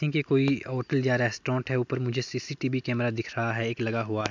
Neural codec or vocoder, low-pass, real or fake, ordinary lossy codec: none; 7.2 kHz; real; MP3, 64 kbps